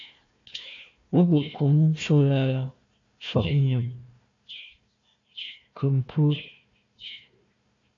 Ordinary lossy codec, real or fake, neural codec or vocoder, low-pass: AAC, 48 kbps; fake; codec, 16 kHz, 1 kbps, FunCodec, trained on Chinese and English, 50 frames a second; 7.2 kHz